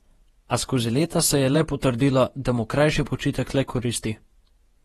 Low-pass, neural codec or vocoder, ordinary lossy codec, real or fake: 19.8 kHz; vocoder, 48 kHz, 128 mel bands, Vocos; AAC, 32 kbps; fake